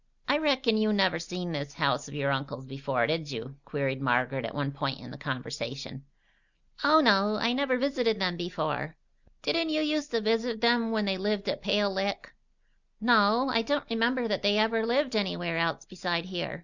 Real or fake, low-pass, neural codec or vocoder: real; 7.2 kHz; none